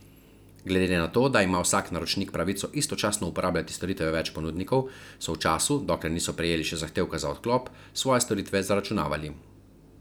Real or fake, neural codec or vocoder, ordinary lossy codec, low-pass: real; none; none; none